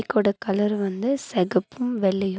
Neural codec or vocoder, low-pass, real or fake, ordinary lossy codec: none; none; real; none